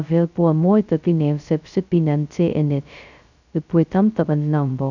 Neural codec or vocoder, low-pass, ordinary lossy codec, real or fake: codec, 16 kHz, 0.2 kbps, FocalCodec; 7.2 kHz; none; fake